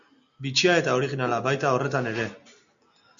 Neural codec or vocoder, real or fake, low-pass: none; real; 7.2 kHz